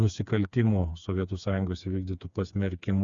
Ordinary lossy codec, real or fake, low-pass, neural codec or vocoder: Opus, 64 kbps; fake; 7.2 kHz; codec, 16 kHz, 4 kbps, FreqCodec, smaller model